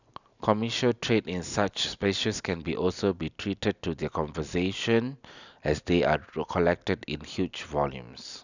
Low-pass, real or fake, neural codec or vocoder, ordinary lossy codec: 7.2 kHz; real; none; none